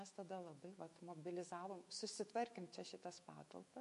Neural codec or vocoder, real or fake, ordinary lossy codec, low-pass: autoencoder, 48 kHz, 128 numbers a frame, DAC-VAE, trained on Japanese speech; fake; MP3, 48 kbps; 14.4 kHz